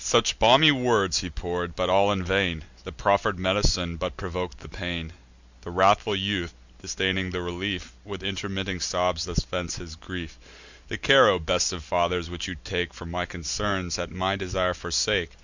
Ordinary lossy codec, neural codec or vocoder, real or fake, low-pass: Opus, 64 kbps; none; real; 7.2 kHz